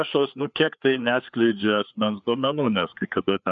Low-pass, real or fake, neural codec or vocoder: 7.2 kHz; fake; codec, 16 kHz, 4 kbps, FreqCodec, larger model